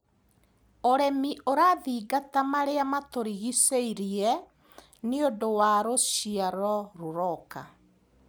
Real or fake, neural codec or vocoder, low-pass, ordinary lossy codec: real; none; none; none